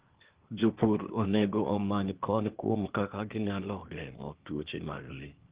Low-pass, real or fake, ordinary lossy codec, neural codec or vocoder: 3.6 kHz; fake; Opus, 16 kbps; codec, 16 kHz, 0.8 kbps, ZipCodec